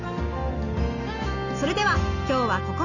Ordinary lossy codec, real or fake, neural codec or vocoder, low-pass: none; real; none; 7.2 kHz